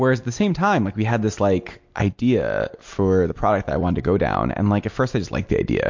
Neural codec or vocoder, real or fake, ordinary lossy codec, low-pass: none; real; MP3, 48 kbps; 7.2 kHz